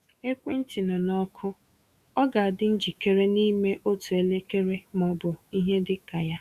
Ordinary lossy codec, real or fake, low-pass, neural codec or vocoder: Opus, 64 kbps; fake; 14.4 kHz; autoencoder, 48 kHz, 128 numbers a frame, DAC-VAE, trained on Japanese speech